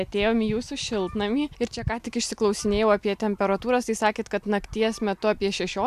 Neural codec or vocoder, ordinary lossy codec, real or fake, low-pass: none; MP3, 96 kbps; real; 14.4 kHz